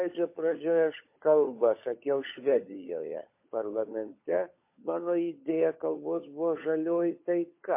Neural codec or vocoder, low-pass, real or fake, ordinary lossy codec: codec, 16 kHz, 16 kbps, FunCodec, trained on LibriTTS, 50 frames a second; 3.6 kHz; fake; MP3, 24 kbps